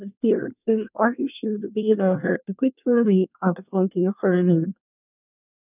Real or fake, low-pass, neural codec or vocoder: fake; 3.6 kHz; codec, 24 kHz, 1 kbps, SNAC